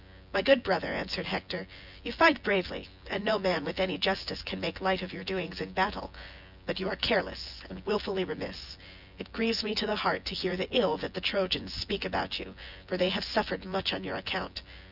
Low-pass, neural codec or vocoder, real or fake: 5.4 kHz; vocoder, 24 kHz, 100 mel bands, Vocos; fake